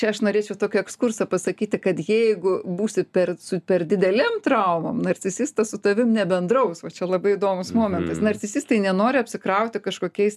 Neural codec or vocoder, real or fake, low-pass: none; real; 14.4 kHz